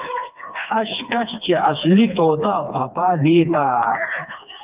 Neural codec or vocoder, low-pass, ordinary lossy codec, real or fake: codec, 16 kHz, 2 kbps, FreqCodec, smaller model; 3.6 kHz; Opus, 24 kbps; fake